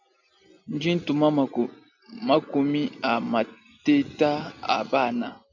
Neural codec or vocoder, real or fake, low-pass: none; real; 7.2 kHz